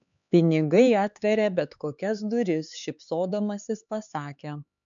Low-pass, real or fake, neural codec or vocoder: 7.2 kHz; fake; codec, 16 kHz, 4 kbps, X-Codec, HuBERT features, trained on LibriSpeech